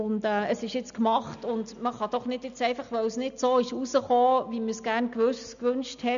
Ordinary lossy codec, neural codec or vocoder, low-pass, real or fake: none; none; 7.2 kHz; real